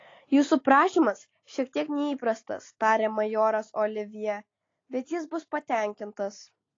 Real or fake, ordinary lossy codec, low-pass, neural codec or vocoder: real; AAC, 32 kbps; 7.2 kHz; none